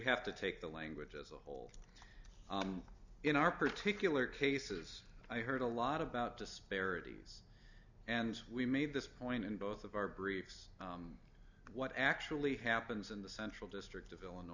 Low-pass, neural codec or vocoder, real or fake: 7.2 kHz; none; real